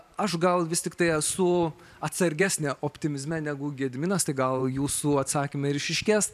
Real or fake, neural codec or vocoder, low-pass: fake; vocoder, 44.1 kHz, 128 mel bands every 512 samples, BigVGAN v2; 14.4 kHz